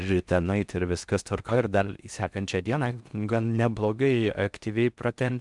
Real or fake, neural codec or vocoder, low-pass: fake; codec, 16 kHz in and 24 kHz out, 0.6 kbps, FocalCodec, streaming, 4096 codes; 10.8 kHz